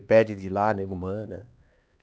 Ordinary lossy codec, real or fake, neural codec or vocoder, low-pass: none; fake; codec, 16 kHz, 2 kbps, X-Codec, HuBERT features, trained on LibriSpeech; none